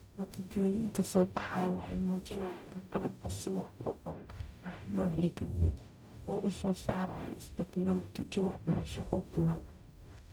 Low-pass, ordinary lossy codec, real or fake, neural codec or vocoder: none; none; fake; codec, 44.1 kHz, 0.9 kbps, DAC